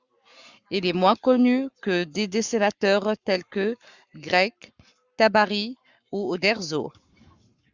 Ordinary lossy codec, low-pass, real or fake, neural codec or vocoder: Opus, 64 kbps; 7.2 kHz; fake; codec, 44.1 kHz, 7.8 kbps, Pupu-Codec